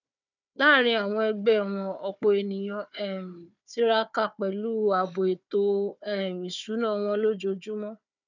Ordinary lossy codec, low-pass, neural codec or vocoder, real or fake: none; 7.2 kHz; codec, 16 kHz, 4 kbps, FunCodec, trained on Chinese and English, 50 frames a second; fake